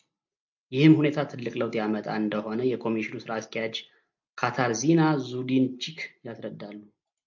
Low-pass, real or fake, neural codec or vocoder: 7.2 kHz; real; none